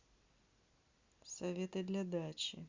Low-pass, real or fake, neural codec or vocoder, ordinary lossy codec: 7.2 kHz; real; none; Opus, 64 kbps